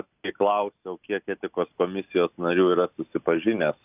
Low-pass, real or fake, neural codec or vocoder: 3.6 kHz; real; none